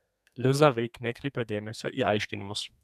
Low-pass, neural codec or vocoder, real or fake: 14.4 kHz; codec, 32 kHz, 1.9 kbps, SNAC; fake